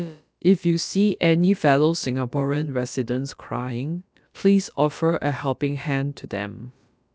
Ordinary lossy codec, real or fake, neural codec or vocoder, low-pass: none; fake; codec, 16 kHz, about 1 kbps, DyCAST, with the encoder's durations; none